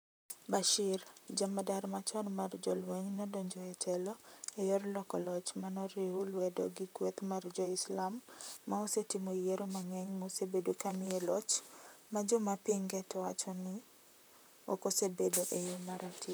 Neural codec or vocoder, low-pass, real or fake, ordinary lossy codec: vocoder, 44.1 kHz, 128 mel bands, Pupu-Vocoder; none; fake; none